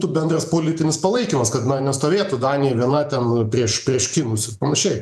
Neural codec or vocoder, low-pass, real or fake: vocoder, 48 kHz, 128 mel bands, Vocos; 14.4 kHz; fake